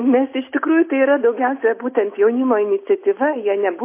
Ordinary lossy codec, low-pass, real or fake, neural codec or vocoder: MP3, 24 kbps; 3.6 kHz; real; none